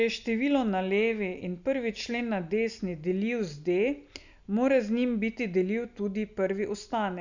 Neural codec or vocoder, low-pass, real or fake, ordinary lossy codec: none; 7.2 kHz; real; none